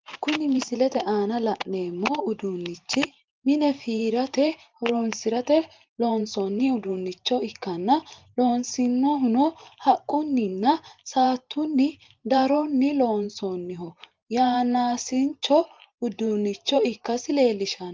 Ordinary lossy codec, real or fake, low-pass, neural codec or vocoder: Opus, 32 kbps; fake; 7.2 kHz; vocoder, 44.1 kHz, 128 mel bands every 512 samples, BigVGAN v2